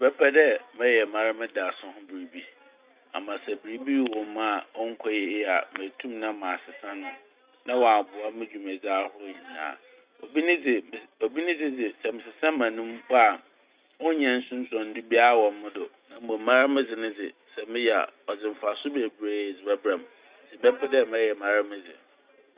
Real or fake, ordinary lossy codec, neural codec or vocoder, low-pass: real; Opus, 64 kbps; none; 3.6 kHz